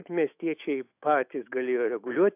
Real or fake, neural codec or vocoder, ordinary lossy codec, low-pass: fake; autoencoder, 48 kHz, 128 numbers a frame, DAC-VAE, trained on Japanese speech; AAC, 24 kbps; 3.6 kHz